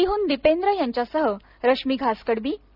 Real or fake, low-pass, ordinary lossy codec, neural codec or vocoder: real; 5.4 kHz; none; none